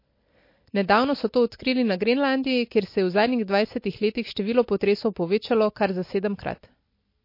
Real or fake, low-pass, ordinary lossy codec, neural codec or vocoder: real; 5.4 kHz; MP3, 32 kbps; none